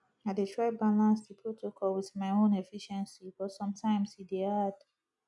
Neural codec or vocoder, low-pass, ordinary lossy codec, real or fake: none; 10.8 kHz; none; real